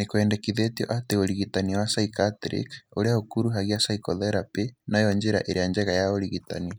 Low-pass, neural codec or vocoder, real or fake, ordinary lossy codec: none; none; real; none